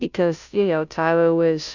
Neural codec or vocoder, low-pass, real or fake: codec, 16 kHz, 0.5 kbps, FunCodec, trained on Chinese and English, 25 frames a second; 7.2 kHz; fake